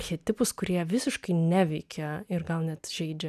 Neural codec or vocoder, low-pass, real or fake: none; 14.4 kHz; real